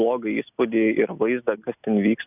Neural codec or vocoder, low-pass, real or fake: none; 3.6 kHz; real